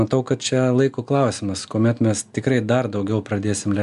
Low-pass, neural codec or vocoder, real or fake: 10.8 kHz; none; real